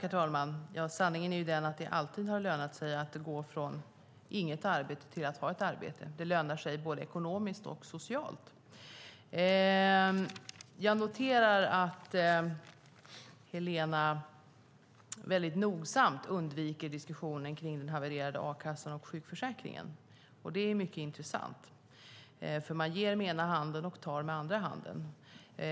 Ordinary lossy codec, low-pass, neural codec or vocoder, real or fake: none; none; none; real